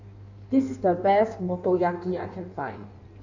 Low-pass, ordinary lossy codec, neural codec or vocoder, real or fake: 7.2 kHz; none; codec, 16 kHz in and 24 kHz out, 1.1 kbps, FireRedTTS-2 codec; fake